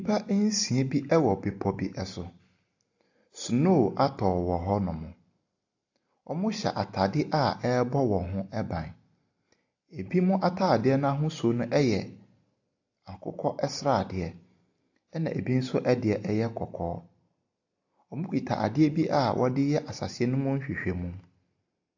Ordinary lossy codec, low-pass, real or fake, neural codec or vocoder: AAC, 48 kbps; 7.2 kHz; real; none